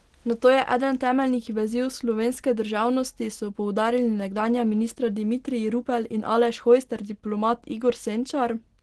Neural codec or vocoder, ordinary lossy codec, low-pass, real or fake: none; Opus, 16 kbps; 10.8 kHz; real